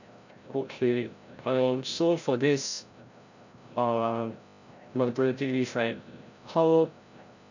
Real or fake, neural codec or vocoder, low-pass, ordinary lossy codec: fake; codec, 16 kHz, 0.5 kbps, FreqCodec, larger model; 7.2 kHz; none